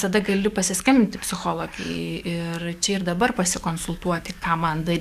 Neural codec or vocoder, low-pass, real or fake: codec, 44.1 kHz, 7.8 kbps, DAC; 14.4 kHz; fake